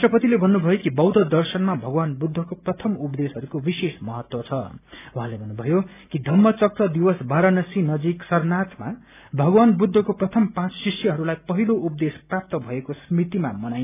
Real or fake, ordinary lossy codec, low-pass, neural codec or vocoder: real; none; 3.6 kHz; none